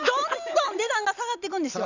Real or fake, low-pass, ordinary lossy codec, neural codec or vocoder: real; 7.2 kHz; none; none